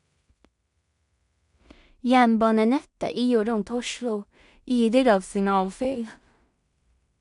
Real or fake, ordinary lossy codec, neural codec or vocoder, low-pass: fake; none; codec, 16 kHz in and 24 kHz out, 0.4 kbps, LongCat-Audio-Codec, two codebook decoder; 10.8 kHz